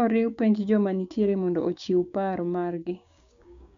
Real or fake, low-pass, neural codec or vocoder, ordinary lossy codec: fake; 7.2 kHz; codec, 16 kHz, 6 kbps, DAC; none